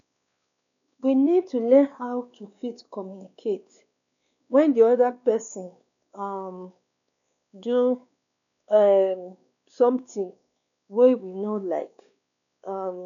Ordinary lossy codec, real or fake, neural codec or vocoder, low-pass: none; fake; codec, 16 kHz, 2 kbps, X-Codec, WavLM features, trained on Multilingual LibriSpeech; 7.2 kHz